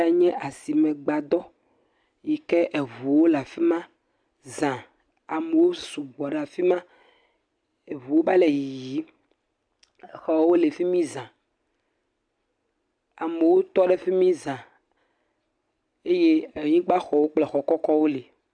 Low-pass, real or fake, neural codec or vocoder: 9.9 kHz; real; none